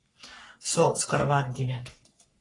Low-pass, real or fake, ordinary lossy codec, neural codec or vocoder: 10.8 kHz; fake; AAC, 32 kbps; codec, 44.1 kHz, 3.4 kbps, Pupu-Codec